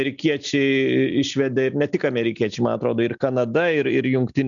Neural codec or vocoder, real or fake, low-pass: none; real; 7.2 kHz